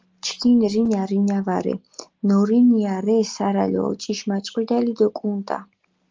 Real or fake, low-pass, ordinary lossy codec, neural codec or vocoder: real; 7.2 kHz; Opus, 24 kbps; none